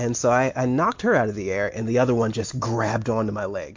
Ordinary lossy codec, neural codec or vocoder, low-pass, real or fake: MP3, 48 kbps; none; 7.2 kHz; real